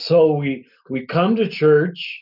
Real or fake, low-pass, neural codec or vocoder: real; 5.4 kHz; none